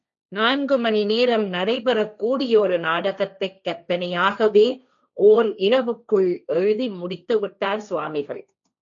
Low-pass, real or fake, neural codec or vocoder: 7.2 kHz; fake; codec, 16 kHz, 1.1 kbps, Voila-Tokenizer